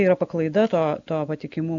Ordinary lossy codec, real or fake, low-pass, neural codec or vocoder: AAC, 64 kbps; real; 7.2 kHz; none